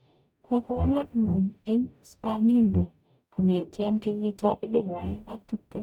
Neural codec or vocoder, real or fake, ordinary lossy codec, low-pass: codec, 44.1 kHz, 0.9 kbps, DAC; fake; none; 19.8 kHz